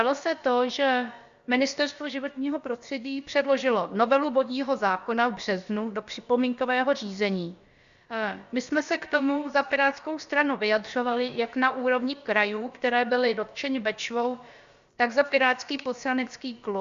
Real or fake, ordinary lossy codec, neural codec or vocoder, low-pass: fake; Opus, 64 kbps; codec, 16 kHz, about 1 kbps, DyCAST, with the encoder's durations; 7.2 kHz